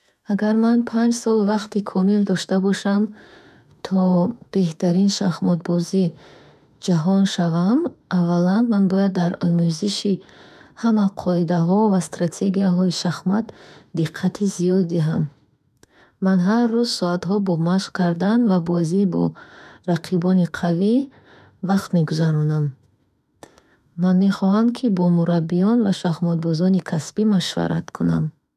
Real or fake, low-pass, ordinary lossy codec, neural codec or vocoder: fake; 14.4 kHz; none; autoencoder, 48 kHz, 32 numbers a frame, DAC-VAE, trained on Japanese speech